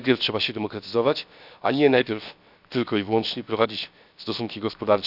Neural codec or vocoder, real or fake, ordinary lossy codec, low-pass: codec, 16 kHz, 0.7 kbps, FocalCodec; fake; none; 5.4 kHz